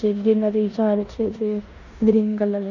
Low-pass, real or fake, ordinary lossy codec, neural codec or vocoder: 7.2 kHz; fake; none; codec, 16 kHz in and 24 kHz out, 0.9 kbps, LongCat-Audio-Codec, four codebook decoder